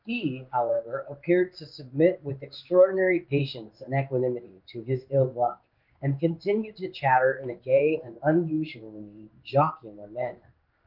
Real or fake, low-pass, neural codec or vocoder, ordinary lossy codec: fake; 5.4 kHz; codec, 16 kHz in and 24 kHz out, 1 kbps, XY-Tokenizer; Opus, 24 kbps